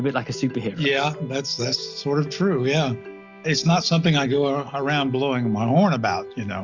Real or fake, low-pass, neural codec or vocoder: real; 7.2 kHz; none